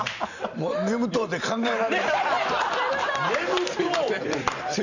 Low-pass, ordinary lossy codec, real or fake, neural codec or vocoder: 7.2 kHz; none; fake; vocoder, 44.1 kHz, 128 mel bands every 512 samples, BigVGAN v2